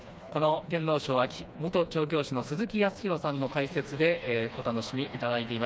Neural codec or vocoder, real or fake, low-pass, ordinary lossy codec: codec, 16 kHz, 2 kbps, FreqCodec, smaller model; fake; none; none